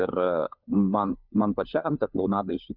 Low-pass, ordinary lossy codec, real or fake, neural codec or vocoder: 5.4 kHz; Opus, 64 kbps; fake; codec, 16 kHz, 4 kbps, FunCodec, trained on LibriTTS, 50 frames a second